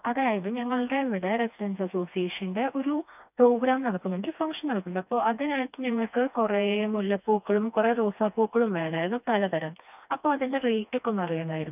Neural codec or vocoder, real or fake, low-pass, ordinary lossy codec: codec, 16 kHz, 2 kbps, FreqCodec, smaller model; fake; 3.6 kHz; none